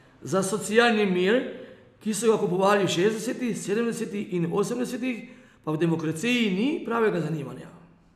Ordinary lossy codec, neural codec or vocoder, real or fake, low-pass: none; none; real; 14.4 kHz